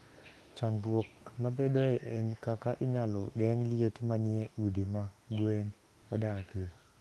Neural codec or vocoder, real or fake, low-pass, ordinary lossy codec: autoencoder, 48 kHz, 32 numbers a frame, DAC-VAE, trained on Japanese speech; fake; 19.8 kHz; Opus, 32 kbps